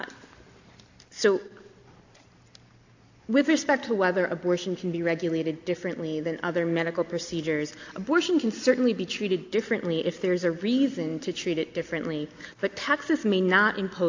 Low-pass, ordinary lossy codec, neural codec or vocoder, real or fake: 7.2 kHz; AAC, 48 kbps; none; real